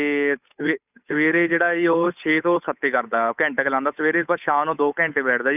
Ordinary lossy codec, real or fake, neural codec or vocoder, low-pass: none; fake; codec, 16 kHz, 8 kbps, FunCodec, trained on Chinese and English, 25 frames a second; 3.6 kHz